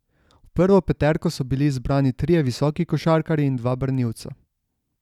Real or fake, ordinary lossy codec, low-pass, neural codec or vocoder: real; none; 19.8 kHz; none